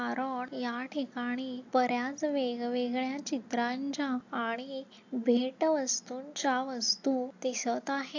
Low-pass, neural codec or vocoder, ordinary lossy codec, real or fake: 7.2 kHz; none; AAC, 48 kbps; real